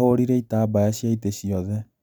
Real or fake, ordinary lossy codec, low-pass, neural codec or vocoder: real; none; none; none